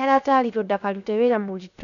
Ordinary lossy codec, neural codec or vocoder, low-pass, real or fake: none; codec, 16 kHz, 0.7 kbps, FocalCodec; 7.2 kHz; fake